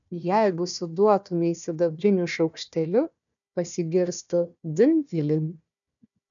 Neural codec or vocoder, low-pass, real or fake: codec, 16 kHz, 1 kbps, FunCodec, trained on Chinese and English, 50 frames a second; 7.2 kHz; fake